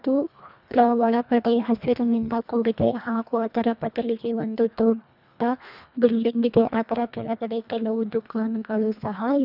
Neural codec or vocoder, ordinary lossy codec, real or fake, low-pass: codec, 24 kHz, 1.5 kbps, HILCodec; none; fake; 5.4 kHz